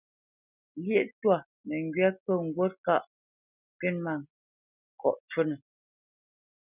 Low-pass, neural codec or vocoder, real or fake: 3.6 kHz; none; real